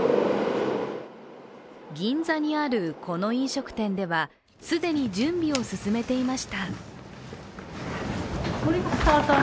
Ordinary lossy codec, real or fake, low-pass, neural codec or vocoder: none; real; none; none